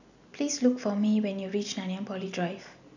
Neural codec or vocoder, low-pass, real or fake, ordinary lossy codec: none; 7.2 kHz; real; none